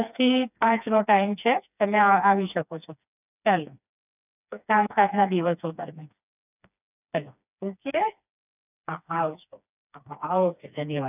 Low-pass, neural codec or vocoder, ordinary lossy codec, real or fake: 3.6 kHz; codec, 16 kHz, 2 kbps, FreqCodec, smaller model; none; fake